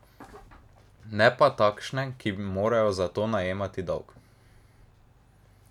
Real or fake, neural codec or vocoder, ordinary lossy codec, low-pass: real; none; none; 19.8 kHz